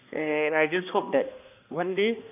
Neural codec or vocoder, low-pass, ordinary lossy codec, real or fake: codec, 16 kHz, 1 kbps, X-Codec, HuBERT features, trained on balanced general audio; 3.6 kHz; none; fake